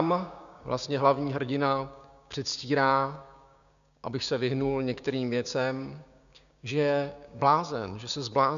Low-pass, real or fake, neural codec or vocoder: 7.2 kHz; fake; codec, 16 kHz, 6 kbps, DAC